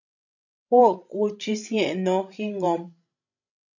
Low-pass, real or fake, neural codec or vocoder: 7.2 kHz; fake; codec, 16 kHz, 16 kbps, FreqCodec, larger model